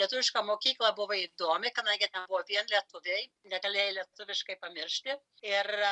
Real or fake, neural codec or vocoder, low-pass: real; none; 10.8 kHz